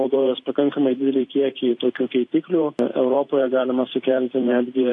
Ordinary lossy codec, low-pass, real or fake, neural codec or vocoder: AAC, 48 kbps; 10.8 kHz; fake; vocoder, 44.1 kHz, 128 mel bands every 512 samples, BigVGAN v2